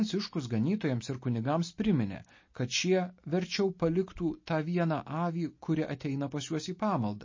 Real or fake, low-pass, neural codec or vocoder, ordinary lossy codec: real; 7.2 kHz; none; MP3, 32 kbps